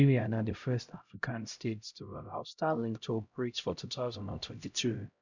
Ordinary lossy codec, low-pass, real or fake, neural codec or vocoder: none; 7.2 kHz; fake; codec, 16 kHz, 0.5 kbps, X-Codec, HuBERT features, trained on LibriSpeech